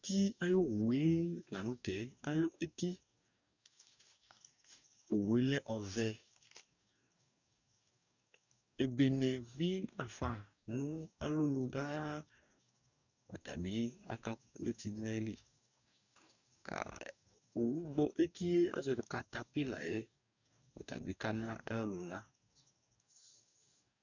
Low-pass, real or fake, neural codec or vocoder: 7.2 kHz; fake; codec, 44.1 kHz, 2.6 kbps, DAC